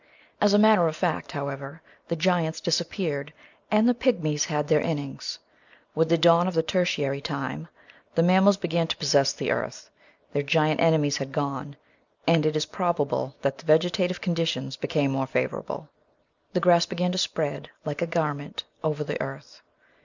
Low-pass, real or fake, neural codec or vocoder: 7.2 kHz; real; none